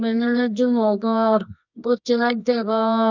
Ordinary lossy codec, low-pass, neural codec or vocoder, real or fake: none; 7.2 kHz; codec, 24 kHz, 0.9 kbps, WavTokenizer, medium music audio release; fake